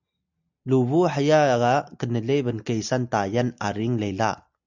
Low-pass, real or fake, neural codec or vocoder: 7.2 kHz; real; none